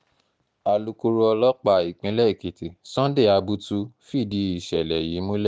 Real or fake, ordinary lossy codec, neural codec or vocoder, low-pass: real; none; none; none